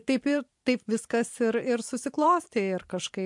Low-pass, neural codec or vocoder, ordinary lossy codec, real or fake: 10.8 kHz; vocoder, 44.1 kHz, 128 mel bands every 512 samples, BigVGAN v2; MP3, 64 kbps; fake